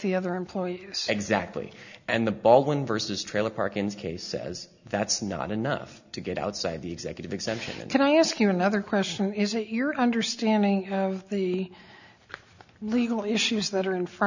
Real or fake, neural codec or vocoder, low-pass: real; none; 7.2 kHz